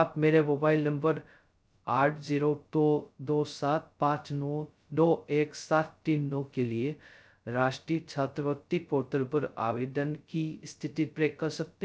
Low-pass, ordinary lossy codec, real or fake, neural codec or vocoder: none; none; fake; codec, 16 kHz, 0.2 kbps, FocalCodec